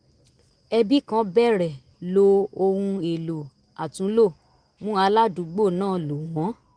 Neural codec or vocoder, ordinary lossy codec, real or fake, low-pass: none; Opus, 24 kbps; real; 9.9 kHz